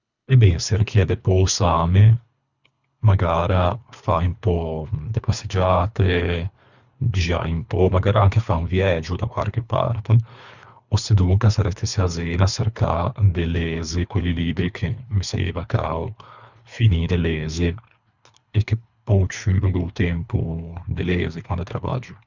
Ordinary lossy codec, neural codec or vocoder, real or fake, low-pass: none; codec, 24 kHz, 3 kbps, HILCodec; fake; 7.2 kHz